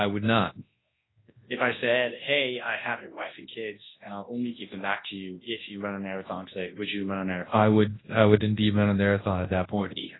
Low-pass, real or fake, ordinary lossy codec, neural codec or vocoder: 7.2 kHz; fake; AAC, 16 kbps; codec, 24 kHz, 0.9 kbps, WavTokenizer, large speech release